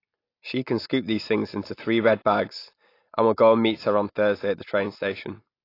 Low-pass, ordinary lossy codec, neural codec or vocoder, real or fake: 5.4 kHz; AAC, 32 kbps; none; real